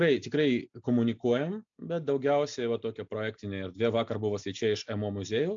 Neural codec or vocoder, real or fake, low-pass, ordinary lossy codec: none; real; 7.2 kHz; AAC, 64 kbps